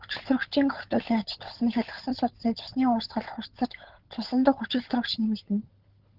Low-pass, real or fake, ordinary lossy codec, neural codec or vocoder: 5.4 kHz; fake; Opus, 16 kbps; codec, 16 kHz, 16 kbps, FunCodec, trained on LibriTTS, 50 frames a second